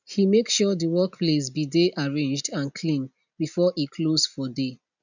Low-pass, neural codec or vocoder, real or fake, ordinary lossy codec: 7.2 kHz; none; real; none